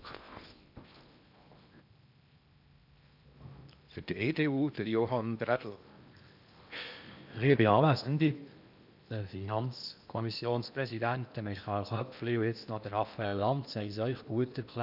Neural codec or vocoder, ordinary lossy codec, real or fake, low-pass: codec, 16 kHz in and 24 kHz out, 0.8 kbps, FocalCodec, streaming, 65536 codes; none; fake; 5.4 kHz